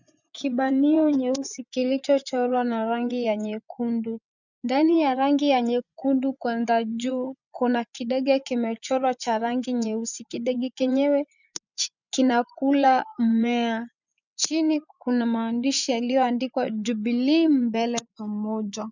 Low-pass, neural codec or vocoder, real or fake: 7.2 kHz; vocoder, 24 kHz, 100 mel bands, Vocos; fake